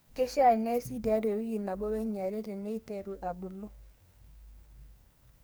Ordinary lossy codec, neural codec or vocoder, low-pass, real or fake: none; codec, 44.1 kHz, 2.6 kbps, SNAC; none; fake